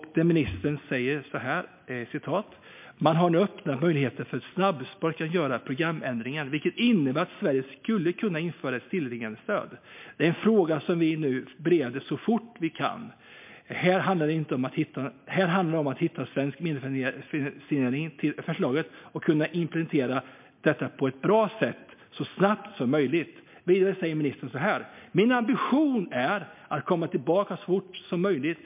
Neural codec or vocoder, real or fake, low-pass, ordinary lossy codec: none; real; 3.6 kHz; MP3, 32 kbps